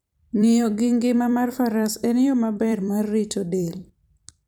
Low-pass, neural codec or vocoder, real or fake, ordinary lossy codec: none; vocoder, 44.1 kHz, 128 mel bands every 256 samples, BigVGAN v2; fake; none